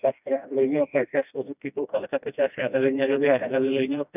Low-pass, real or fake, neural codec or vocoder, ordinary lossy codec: 3.6 kHz; fake; codec, 16 kHz, 1 kbps, FreqCodec, smaller model; none